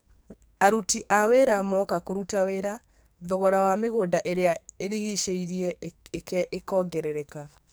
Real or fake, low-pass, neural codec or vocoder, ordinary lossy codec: fake; none; codec, 44.1 kHz, 2.6 kbps, SNAC; none